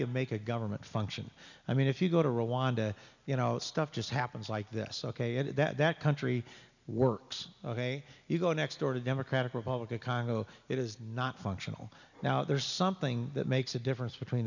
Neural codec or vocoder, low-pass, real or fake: none; 7.2 kHz; real